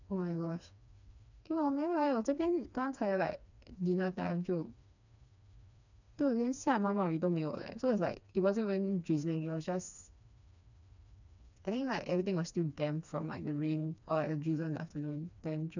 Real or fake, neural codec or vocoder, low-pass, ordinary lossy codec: fake; codec, 16 kHz, 2 kbps, FreqCodec, smaller model; 7.2 kHz; none